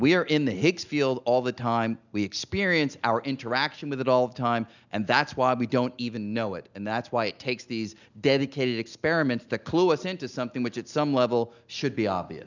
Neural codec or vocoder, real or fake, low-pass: none; real; 7.2 kHz